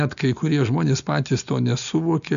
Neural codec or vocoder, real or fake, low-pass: none; real; 7.2 kHz